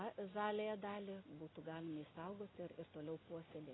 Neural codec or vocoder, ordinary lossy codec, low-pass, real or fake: none; AAC, 16 kbps; 7.2 kHz; real